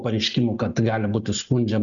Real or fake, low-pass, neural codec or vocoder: real; 7.2 kHz; none